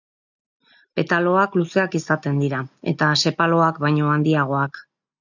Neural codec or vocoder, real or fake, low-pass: none; real; 7.2 kHz